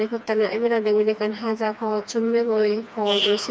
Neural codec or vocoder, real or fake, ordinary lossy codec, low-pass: codec, 16 kHz, 2 kbps, FreqCodec, smaller model; fake; none; none